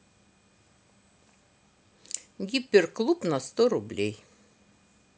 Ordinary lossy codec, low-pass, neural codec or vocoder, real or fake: none; none; none; real